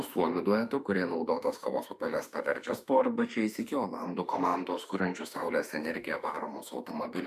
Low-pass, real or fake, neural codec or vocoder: 14.4 kHz; fake; autoencoder, 48 kHz, 32 numbers a frame, DAC-VAE, trained on Japanese speech